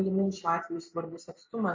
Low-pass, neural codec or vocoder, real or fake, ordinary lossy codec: 7.2 kHz; none; real; MP3, 48 kbps